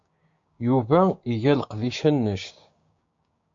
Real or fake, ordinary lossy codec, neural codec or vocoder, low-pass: fake; MP3, 48 kbps; codec, 16 kHz, 6 kbps, DAC; 7.2 kHz